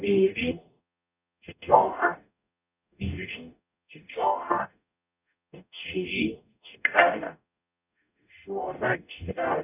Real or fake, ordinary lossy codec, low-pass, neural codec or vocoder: fake; none; 3.6 kHz; codec, 44.1 kHz, 0.9 kbps, DAC